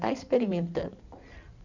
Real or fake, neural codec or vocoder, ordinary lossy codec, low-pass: fake; codec, 16 kHz in and 24 kHz out, 2.2 kbps, FireRedTTS-2 codec; none; 7.2 kHz